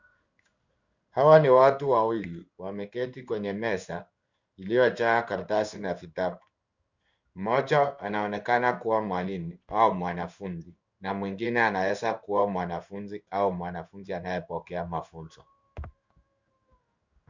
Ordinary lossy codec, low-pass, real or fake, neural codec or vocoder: Opus, 64 kbps; 7.2 kHz; fake; codec, 16 kHz in and 24 kHz out, 1 kbps, XY-Tokenizer